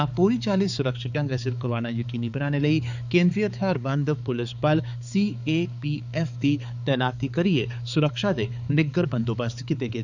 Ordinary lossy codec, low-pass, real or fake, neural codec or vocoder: none; 7.2 kHz; fake; codec, 16 kHz, 4 kbps, X-Codec, HuBERT features, trained on balanced general audio